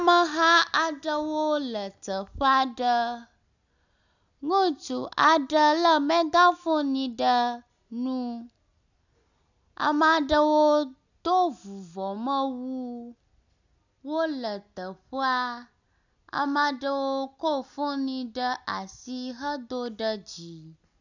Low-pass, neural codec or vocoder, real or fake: 7.2 kHz; none; real